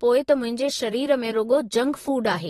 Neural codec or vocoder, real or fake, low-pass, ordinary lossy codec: vocoder, 44.1 kHz, 128 mel bands, Pupu-Vocoder; fake; 19.8 kHz; AAC, 32 kbps